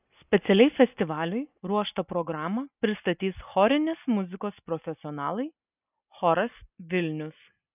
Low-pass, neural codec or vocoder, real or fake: 3.6 kHz; none; real